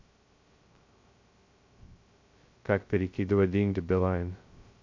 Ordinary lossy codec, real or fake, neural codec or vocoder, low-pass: MP3, 48 kbps; fake; codec, 16 kHz, 0.2 kbps, FocalCodec; 7.2 kHz